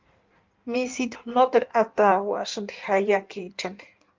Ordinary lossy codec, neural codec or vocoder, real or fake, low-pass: Opus, 24 kbps; codec, 16 kHz in and 24 kHz out, 1.1 kbps, FireRedTTS-2 codec; fake; 7.2 kHz